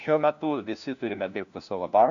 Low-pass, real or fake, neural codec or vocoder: 7.2 kHz; fake; codec, 16 kHz, 1 kbps, FunCodec, trained on LibriTTS, 50 frames a second